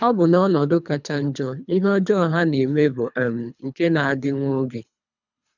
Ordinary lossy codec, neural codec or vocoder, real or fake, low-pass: none; codec, 24 kHz, 3 kbps, HILCodec; fake; 7.2 kHz